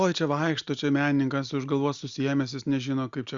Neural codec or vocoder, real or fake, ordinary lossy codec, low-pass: none; real; Opus, 64 kbps; 7.2 kHz